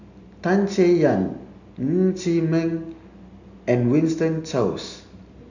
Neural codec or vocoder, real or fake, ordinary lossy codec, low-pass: none; real; none; 7.2 kHz